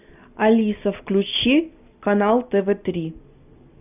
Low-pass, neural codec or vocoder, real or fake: 3.6 kHz; none; real